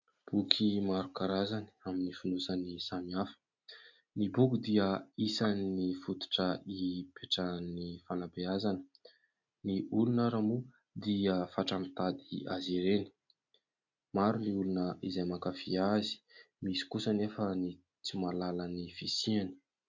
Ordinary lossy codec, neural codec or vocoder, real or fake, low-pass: MP3, 64 kbps; none; real; 7.2 kHz